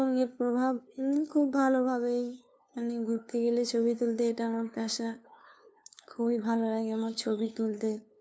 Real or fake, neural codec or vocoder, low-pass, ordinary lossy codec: fake; codec, 16 kHz, 2 kbps, FunCodec, trained on LibriTTS, 25 frames a second; none; none